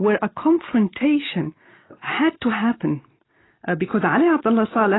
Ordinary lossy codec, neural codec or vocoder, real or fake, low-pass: AAC, 16 kbps; none; real; 7.2 kHz